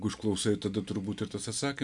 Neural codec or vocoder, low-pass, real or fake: none; 10.8 kHz; real